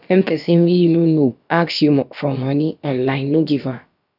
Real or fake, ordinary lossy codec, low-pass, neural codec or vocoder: fake; none; 5.4 kHz; codec, 16 kHz, about 1 kbps, DyCAST, with the encoder's durations